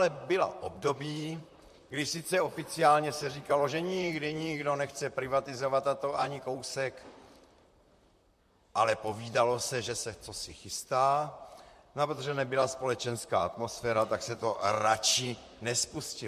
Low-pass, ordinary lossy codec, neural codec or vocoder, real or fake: 14.4 kHz; MP3, 64 kbps; vocoder, 44.1 kHz, 128 mel bands, Pupu-Vocoder; fake